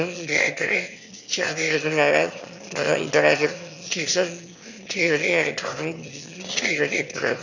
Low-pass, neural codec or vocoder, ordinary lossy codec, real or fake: 7.2 kHz; autoencoder, 22.05 kHz, a latent of 192 numbers a frame, VITS, trained on one speaker; none; fake